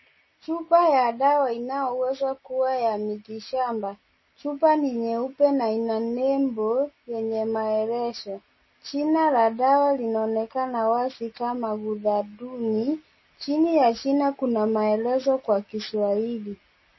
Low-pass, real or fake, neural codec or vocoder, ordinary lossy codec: 7.2 kHz; real; none; MP3, 24 kbps